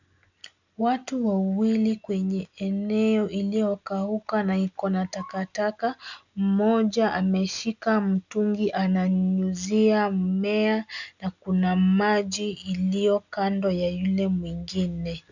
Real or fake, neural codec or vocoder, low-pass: real; none; 7.2 kHz